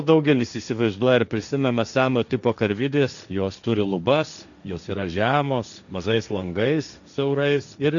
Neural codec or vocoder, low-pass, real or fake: codec, 16 kHz, 1.1 kbps, Voila-Tokenizer; 7.2 kHz; fake